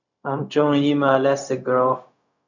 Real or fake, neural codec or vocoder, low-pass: fake; codec, 16 kHz, 0.4 kbps, LongCat-Audio-Codec; 7.2 kHz